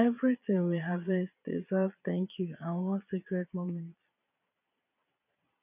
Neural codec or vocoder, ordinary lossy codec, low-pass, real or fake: vocoder, 22.05 kHz, 80 mel bands, Vocos; none; 3.6 kHz; fake